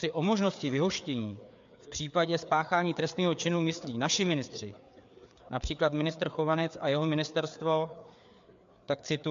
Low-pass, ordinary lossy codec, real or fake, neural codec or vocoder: 7.2 kHz; MP3, 64 kbps; fake; codec, 16 kHz, 4 kbps, FreqCodec, larger model